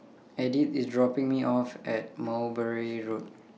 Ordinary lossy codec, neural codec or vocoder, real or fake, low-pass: none; none; real; none